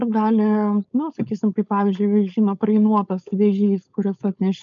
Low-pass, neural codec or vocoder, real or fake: 7.2 kHz; codec, 16 kHz, 4.8 kbps, FACodec; fake